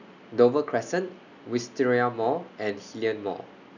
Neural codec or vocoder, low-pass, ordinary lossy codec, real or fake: none; 7.2 kHz; none; real